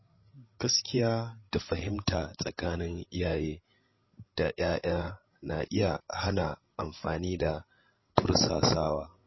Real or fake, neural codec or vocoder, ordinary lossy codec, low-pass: fake; codec, 16 kHz, 16 kbps, FreqCodec, larger model; MP3, 24 kbps; 7.2 kHz